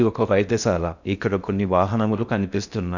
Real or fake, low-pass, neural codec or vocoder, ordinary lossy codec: fake; 7.2 kHz; codec, 16 kHz in and 24 kHz out, 0.6 kbps, FocalCodec, streaming, 4096 codes; none